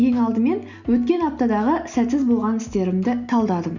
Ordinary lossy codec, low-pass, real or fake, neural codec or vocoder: none; 7.2 kHz; real; none